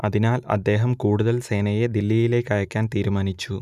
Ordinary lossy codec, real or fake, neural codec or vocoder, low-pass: none; real; none; 14.4 kHz